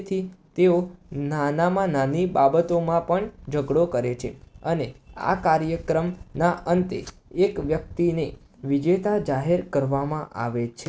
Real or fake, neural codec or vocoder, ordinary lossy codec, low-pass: real; none; none; none